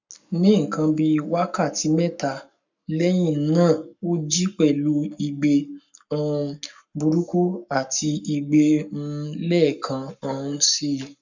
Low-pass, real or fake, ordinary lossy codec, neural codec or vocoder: 7.2 kHz; fake; none; codec, 44.1 kHz, 7.8 kbps, Pupu-Codec